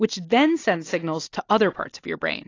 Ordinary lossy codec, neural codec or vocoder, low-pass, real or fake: AAC, 32 kbps; none; 7.2 kHz; real